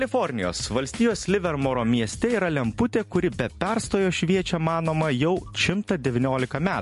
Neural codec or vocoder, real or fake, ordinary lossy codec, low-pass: none; real; MP3, 48 kbps; 14.4 kHz